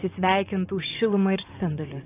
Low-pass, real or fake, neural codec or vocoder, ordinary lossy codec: 3.6 kHz; fake; vocoder, 24 kHz, 100 mel bands, Vocos; AAC, 24 kbps